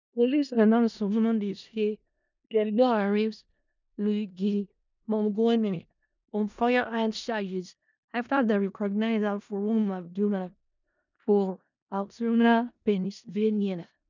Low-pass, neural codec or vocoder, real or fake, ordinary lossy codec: 7.2 kHz; codec, 16 kHz in and 24 kHz out, 0.4 kbps, LongCat-Audio-Codec, four codebook decoder; fake; none